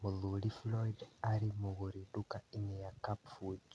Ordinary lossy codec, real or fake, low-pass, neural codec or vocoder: none; real; none; none